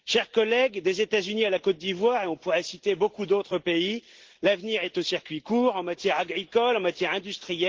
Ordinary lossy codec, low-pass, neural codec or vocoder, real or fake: Opus, 16 kbps; 7.2 kHz; none; real